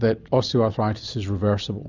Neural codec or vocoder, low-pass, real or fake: none; 7.2 kHz; real